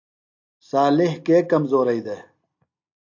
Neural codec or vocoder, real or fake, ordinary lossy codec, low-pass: none; real; AAC, 48 kbps; 7.2 kHz